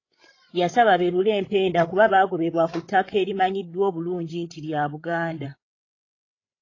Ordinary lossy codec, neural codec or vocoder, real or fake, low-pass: AAC, 32 kbps; codec, 16 kHz, 16 kbps, FreqCodec, larger model; fake; 7.2 kHz